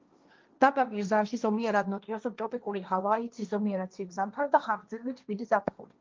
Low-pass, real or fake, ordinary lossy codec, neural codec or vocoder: 7.2 kHz; fake; Opus, 24 kbps; codec, 16 kHz, 1.1 kbps, Voila-Tokenizer